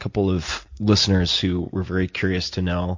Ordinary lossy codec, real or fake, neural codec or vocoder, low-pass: MP3, 48 kbps; real; none; 7.2 kHz